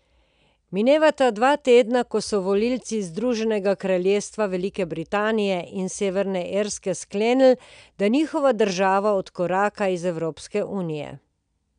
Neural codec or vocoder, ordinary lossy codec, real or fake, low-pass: none; none; real; 9.9 kHz